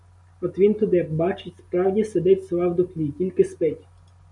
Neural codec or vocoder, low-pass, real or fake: none; 10.8 kHz; real